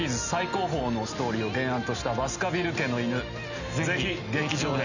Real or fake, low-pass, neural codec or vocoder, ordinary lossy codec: real; 7.2 kHz; none; none